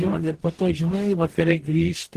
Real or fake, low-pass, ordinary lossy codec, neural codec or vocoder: fake; 14.4 kHz; Opus, 16 kbps; codec, 44.1 kHz, 0.9 kbps, DAC